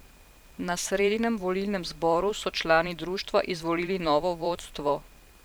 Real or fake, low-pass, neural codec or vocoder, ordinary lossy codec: fake; none; vocoder, 44.1 kHz, 128 mel bands every 512 samples, BigVGAN v2; none